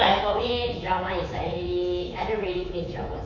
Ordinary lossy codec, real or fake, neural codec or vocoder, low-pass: MP3, 48 kbps; fake; codec, 24 kHz, 3.1 kbps, DualCodec; 7.2 kHz